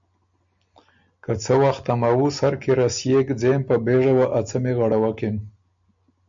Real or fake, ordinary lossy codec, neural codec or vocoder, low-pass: real; MP3, 64 kbps; none; 7.2 kHz